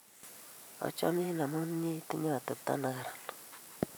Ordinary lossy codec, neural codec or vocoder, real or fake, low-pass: none; vocoder, 44.1 kHz, 128 mel bands every 512 samples, BigVGAN v2; fake; none